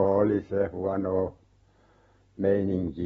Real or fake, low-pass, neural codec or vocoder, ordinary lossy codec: real; 19.8 kHz; none; AAC, 24 kbps